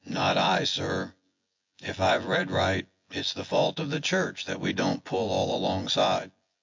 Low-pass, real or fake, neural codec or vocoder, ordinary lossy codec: 7.2 kHz; fake; vocoder, 24 kHz, 100 mel bands, Vocos; MP3, 48 kbps